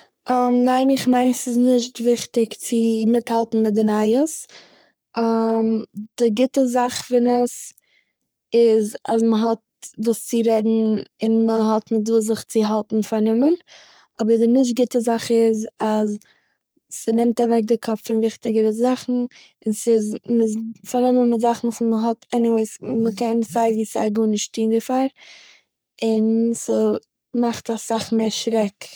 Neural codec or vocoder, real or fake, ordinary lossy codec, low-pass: codec, 44.1 kHz, 3.4 kbps, Pupu-Codec; fake; none; none